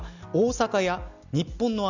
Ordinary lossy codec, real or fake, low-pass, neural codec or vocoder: none; real; 7.2 kHz; none